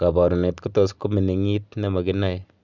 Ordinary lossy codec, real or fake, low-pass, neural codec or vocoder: none; real; 7.2 kHz; none